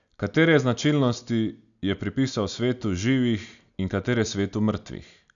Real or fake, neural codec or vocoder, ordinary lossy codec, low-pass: real; none; none; 7.2 kHz